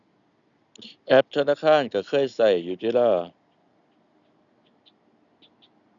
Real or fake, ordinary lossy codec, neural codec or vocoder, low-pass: real; none; none; 7.2 kHz